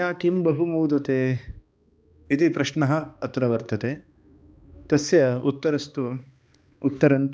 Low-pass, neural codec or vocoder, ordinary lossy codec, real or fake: none; codec, 16 kHz, 2 kbps, X-Codec, HuBERT features, trained on balanced general audio; none; fake